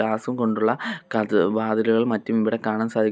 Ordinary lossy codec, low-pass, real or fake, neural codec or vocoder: none; none; real; none